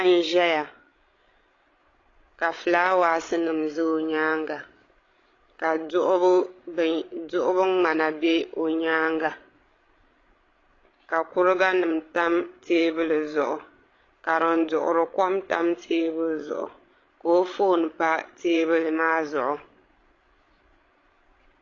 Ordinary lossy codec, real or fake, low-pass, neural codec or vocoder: AAC, 32 kbps; fake; 7.2 kHz; codec, 16 kHz, 16 kbps, FreqCodec, larger model